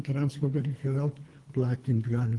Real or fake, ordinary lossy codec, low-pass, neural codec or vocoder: fake; Opus, 24 kbps; 10.8 kHz; codec, 24 kHz, 3 kbps, HILCodec